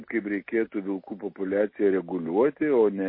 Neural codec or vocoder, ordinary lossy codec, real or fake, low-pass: none; MP3, 24 kbps; real; 5.4 kHz